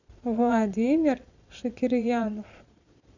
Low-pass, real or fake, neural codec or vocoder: 7.2 kHz; fake; vocoder, 22.05 kHz, 80 mel bands, Vocos